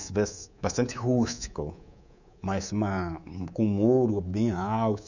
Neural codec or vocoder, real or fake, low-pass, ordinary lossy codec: codec, 24 kHz, 3.1 kbps, DualCodec; fake; 7.2 kHz; none